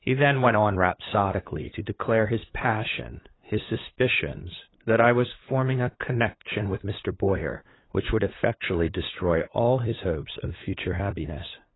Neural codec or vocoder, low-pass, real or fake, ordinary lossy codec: codec, 16 kHz, 8 kbps, FunCodec, trained on LibriTTS, 25 frames a second; 7.2 kHz; fake; AAC, 16 kbps